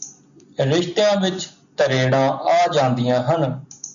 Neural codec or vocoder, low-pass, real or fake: none; 7.2 kHz; real